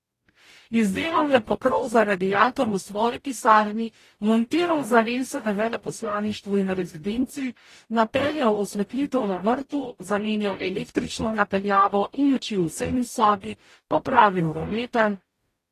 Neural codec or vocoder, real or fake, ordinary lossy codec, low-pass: codec, 44.1 kHz, 0.9 kbps, DAC; fake; AAC, 48 kbps; 14.4 kHz